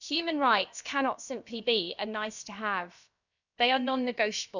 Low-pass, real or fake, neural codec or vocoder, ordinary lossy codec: 7.2 kHz; fake; codec, 16 kHz, about 1 kbps, DyCAST, with the encoder's durations; none